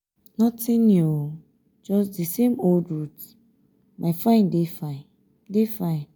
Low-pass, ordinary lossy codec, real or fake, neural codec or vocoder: none; none; real; none